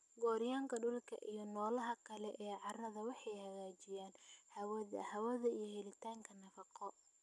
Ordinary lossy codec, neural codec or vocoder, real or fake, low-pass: none; none; real; none